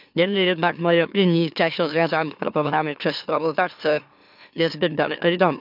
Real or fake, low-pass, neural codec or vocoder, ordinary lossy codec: fake; 5.4 kHz; autoencoder, 44.1 kHz, a latent of 192 numbers a frame, MeloTTS; none